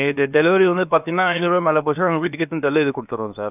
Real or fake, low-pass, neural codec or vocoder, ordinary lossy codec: fake; 3.6 kHz; codec, 16 kHz, about 1 kbps, DyCAST, with the encoder's durations; none